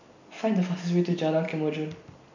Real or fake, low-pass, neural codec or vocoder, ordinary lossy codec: real; 7.2 kHz; none; none